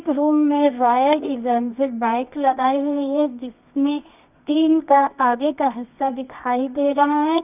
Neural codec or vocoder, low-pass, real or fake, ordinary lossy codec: codec, 24 kHz, 0.9 kbps, WavTokenizer, medium music audio release; 3.6 kHz; fake; none